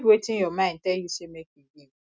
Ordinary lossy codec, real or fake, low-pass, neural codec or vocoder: none; real; none; none